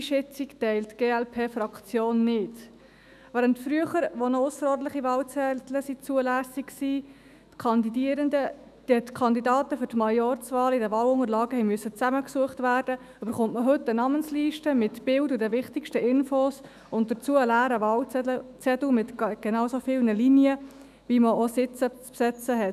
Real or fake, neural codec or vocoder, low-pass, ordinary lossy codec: fake; autoencoder, 48 kHz, 128 numbers a frame, DAC-VAE, trained on Japanese speech; 14.4 kHz; none